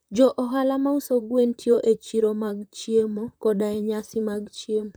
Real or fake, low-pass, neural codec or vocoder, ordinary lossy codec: fake; none; vocoder, 44.1 kHz, 128 mel bands, Pupu-Vocoder; none